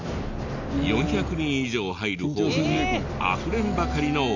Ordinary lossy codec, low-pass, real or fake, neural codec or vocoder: none; 7.2 kHz; real; none